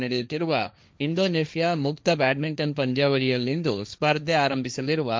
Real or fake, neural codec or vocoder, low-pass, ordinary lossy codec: fake; codec, 16 kHz, 1.1 kbps, Voila-Tokenizer; none; none